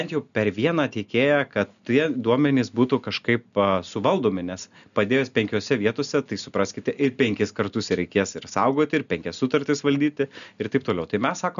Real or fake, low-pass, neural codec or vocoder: real; 7.2 kHz; none